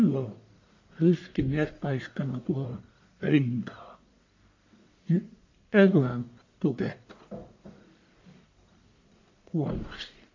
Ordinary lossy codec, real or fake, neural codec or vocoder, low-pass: MP3, 48 kbps; fake; codec, 44.1 kHz, 1.7 kbps, Pupu-Codec; 7.2 kHz